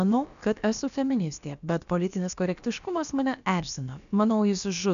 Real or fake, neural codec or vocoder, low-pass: fake; codec, 16 kHz, about 1 kbps, DyCAST, with the encoder's durations; 7.2 kHz